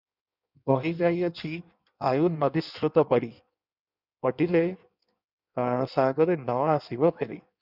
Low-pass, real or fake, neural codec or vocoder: 5.4 kHz; fake; codec, 16 kHz in and 24 kHz out, 1.1 kbps, FireRedTTS-2 codec